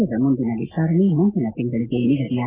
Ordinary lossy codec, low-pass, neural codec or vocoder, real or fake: Opus, 32 kbps; 3.6 kHz; none; real